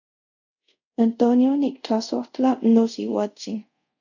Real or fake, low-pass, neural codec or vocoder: fake; 7.2 kHz; codec, 24 kHz, 0.5 kbps, DualCodec